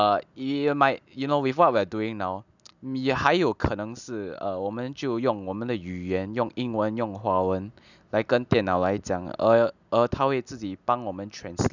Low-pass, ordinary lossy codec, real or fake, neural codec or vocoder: 7.2 kHz; none; real; none